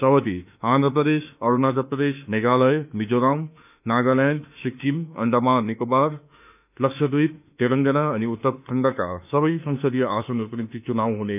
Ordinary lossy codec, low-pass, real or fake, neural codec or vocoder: none; 3.6 kHz; fake; autoencoder, 48 kHz, 32 numbers a frame, DAC-VAE, trained on Japanese speech